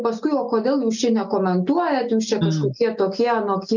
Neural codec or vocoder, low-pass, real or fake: none; 7.2 kHz; real